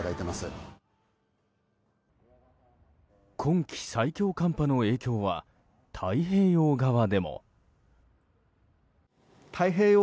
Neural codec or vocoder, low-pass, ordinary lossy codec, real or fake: none; none; none; real